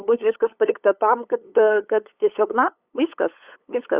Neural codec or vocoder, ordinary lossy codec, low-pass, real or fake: codec, 16 kHz, 8 kbps, FunCodec, trained on LibriTTS, 25 frames a second; Opus, 64 kbps; 3.6 kHz; fake